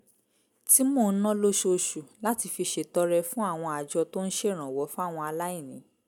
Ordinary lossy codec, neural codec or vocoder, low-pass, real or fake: none; none; none; real